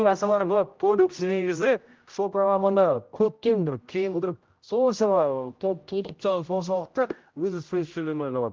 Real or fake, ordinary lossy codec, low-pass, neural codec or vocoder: fake; Opus, 24 kbps; 7.2 kHz; codec, 16 kHz, 0.5 kbps, X-Codec, HuBERT features, trained on general audio